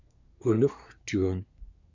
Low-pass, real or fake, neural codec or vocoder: 7.2 kHz; fake; codec, 24 kHz, 1 kbps, SNAC